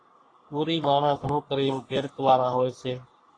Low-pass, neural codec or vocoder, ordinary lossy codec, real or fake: 9.9 kHz; codec, 44.1 kHz, 3.4 kbps, Pupu-Codec; AAC, 32 kbps; fake